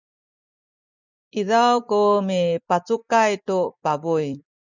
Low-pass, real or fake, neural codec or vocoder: 7.2 kHz; fake; vocoder, 44.1 kHz, 128 mel bands every 256 samples, BigVGAN v2